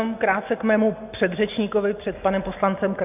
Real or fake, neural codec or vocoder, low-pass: real; none; 3.6 kHz